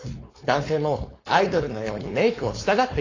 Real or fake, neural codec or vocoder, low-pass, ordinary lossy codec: fake; codec, 16 kHz, 4.8 kbps, FACodec; 7.2 kHz; AAC, 32 kbps